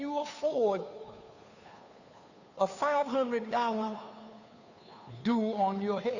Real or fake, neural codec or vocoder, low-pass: fake; codec, 16 kHz, 2 kbps, FunCodec, trained on Chinese and English, 25 frames a second; 7.2 kHz